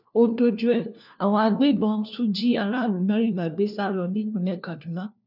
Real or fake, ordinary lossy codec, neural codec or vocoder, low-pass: fake; none; codec, 16 kHz, 1 kbps, FunCodec, trained on LibriTTS, 50 frames a second; 5.4 kHz